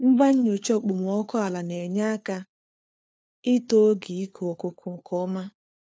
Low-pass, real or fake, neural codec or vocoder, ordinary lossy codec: none; fake; codec, 16 kHz, 4 kbps, FunCodec, trained on LibriTTS, 50 frames a second; none